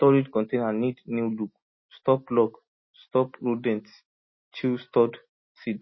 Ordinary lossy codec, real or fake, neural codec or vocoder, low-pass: MP3, 24 kbps; real; none; 7.2 kHz